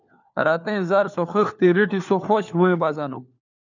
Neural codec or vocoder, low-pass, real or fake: codec, 16 kHz, 4 kbps, FunCodec, trained on LibriTTS, 50 frames a second; 7.2 kHz; fake